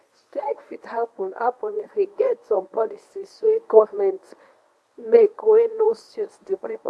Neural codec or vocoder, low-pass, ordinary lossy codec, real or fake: codec, 24 kHz, 0.9 kbps, WavTokenizer, medium speech release version 1; none; none; fake